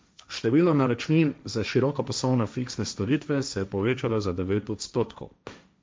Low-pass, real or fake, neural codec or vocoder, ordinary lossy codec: none; fake; codec, 16 kHz, 1.1 kbps, Voila-Tokenizer; none